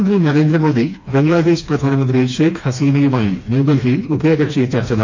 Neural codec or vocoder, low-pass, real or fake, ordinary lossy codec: codec, 16 kHz, 2 kbps, FreqCodec, smaller model; 7.2 kHz; fake; MP3, 48 kbps